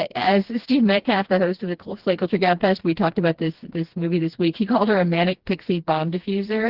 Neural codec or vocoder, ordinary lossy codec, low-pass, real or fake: codec, 16 kHz, 2 kbps, FreqCodec, smaller model; Opus, 16 kbps; 5.4 kHz; fake